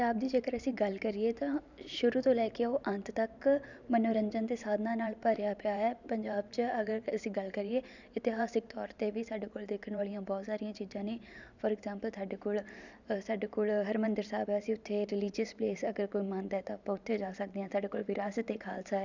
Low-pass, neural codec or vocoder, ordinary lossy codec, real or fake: 7.2 kHz; vocoder, 44.1 kHz, 128 mel bands every 512 samples, BigVGAN v2; none; fake